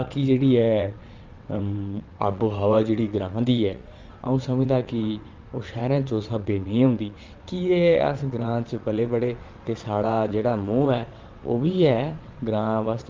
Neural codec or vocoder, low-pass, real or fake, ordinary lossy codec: vocoder, 22.05 kHz, 80 mel bands, WaveNeXt; 7.2 kHz; fake; Opus, 32 kbps